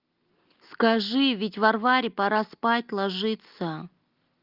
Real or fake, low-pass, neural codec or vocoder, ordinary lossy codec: real; 5.4 kHz; none; Opus, 32 kbps